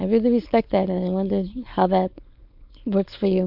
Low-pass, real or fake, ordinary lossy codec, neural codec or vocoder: 5.4 kHz; fake; MP3, 48 kbps; codec, 16 kHz, 4.8 kbps, FACodec